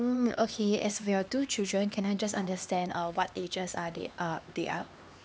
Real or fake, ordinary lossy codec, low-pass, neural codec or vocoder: fake; none; none; codec, 16 kHz, 2 kbps, X-Codec, HuBERT features, trained on LibriSpeech